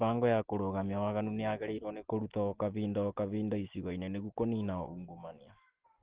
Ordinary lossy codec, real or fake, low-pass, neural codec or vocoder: Opus, 16 kbps; real; 3.6 kHz; none